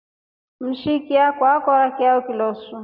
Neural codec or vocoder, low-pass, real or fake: none; 5.4 kHz; real